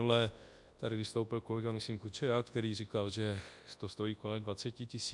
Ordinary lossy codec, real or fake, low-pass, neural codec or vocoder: MP3, 64 kbps; fake; 10.8 kHz; codec, 24 kHz, 0.9 kbps, WavTokenizer, large speech release